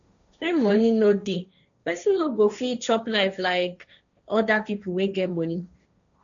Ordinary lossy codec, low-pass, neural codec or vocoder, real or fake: none; 7.2 kHz; codec, 16 kHz, 1.1 kbps, Voila-Tokenizer; fake